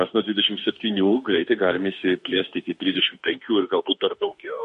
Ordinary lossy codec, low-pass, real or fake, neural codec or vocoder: MP3, 48 kbps; 14.4 kHz; fake; autoencoder, 48 kHz, 32 numbers a frame, DAC-VAE, trained on Japanese speech